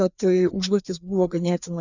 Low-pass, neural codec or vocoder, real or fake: 7.2 kHz; codec, 16 kHz, 2 kbps, FreqCodec, larger model; fake